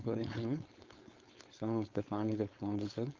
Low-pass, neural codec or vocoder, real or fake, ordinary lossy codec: 7.2 kHz; codec, 16 kHz, 4.8 kbps, FACodec; fake; Opus, 24 kbps